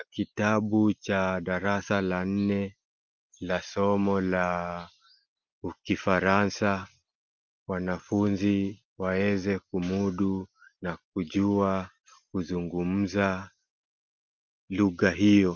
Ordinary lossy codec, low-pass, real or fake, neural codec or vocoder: Opus, 24 kbps; 7.2 kHz; real; none